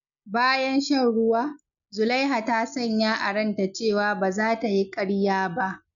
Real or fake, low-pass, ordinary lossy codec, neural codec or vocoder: real; 7.2 kHz; none; none